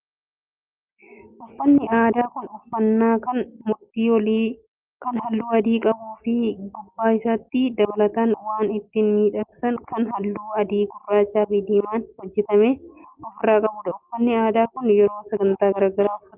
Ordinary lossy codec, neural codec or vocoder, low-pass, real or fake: Opus, 24 kbps; none; 3.6 kHz; real